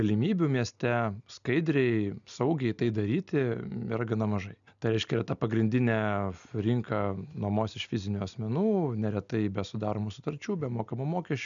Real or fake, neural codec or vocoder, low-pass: real; none; 7.2 kHz